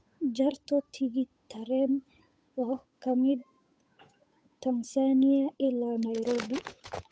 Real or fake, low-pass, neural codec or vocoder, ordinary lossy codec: fake; none; codec, 16 kHz, 8 kbps, FunCodec, trained on Chinese and English, 25 frames a second; none